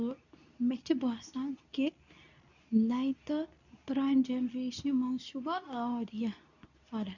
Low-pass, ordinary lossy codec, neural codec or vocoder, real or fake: 7.2 kHz; none; codec, 24 kHz, 0.9 kbps, WavTokenizer, medium speech release version 2; fake